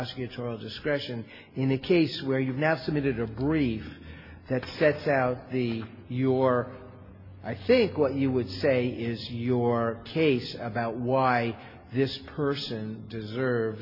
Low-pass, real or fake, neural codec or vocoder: 5.4 kHz; real; none